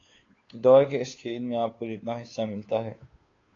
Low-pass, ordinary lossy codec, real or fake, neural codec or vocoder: 7.2 kHz; AAC, 32 kbps; fake; codec, 16 kHz, 2 kbps, FunCodec, trained on Chinese and English, 25 frames a second